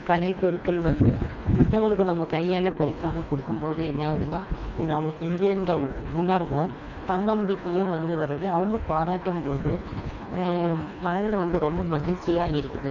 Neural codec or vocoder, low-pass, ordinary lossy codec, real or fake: codec, 24 kHz, 1.5 kbps, HILCodec; 7.2 kHz; none; fake